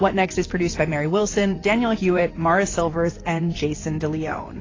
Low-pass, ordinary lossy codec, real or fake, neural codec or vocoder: 7.2 kHz; AAC, 32 kbps; fake; vocoder, 44.1 kHz, 128 mel bands, Pupu-Vocoder